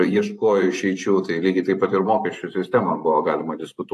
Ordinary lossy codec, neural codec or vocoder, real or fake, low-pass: AAC, 64 kbps; vocoder, 44.1 kHz, 128 mel bands every 512 samples, BigVGAN v2; fake; 14.4 kHz